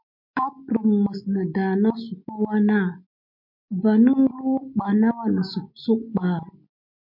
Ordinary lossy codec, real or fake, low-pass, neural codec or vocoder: MP3, 48 kbps; real; 5.4 kHz; none